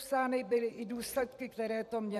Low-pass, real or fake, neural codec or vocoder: 14.4 kHz; fake; vocoder, 44.1 kHz, 128 mel bands every 512 samples, BigVGAN v2